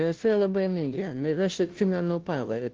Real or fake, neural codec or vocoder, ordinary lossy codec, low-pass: fake; codec, 16 kHz, 0.5 kbps, FunCodec, trained on Chinese and English, 25 frames a second; Opus, 16 kbps; 7.2 kHz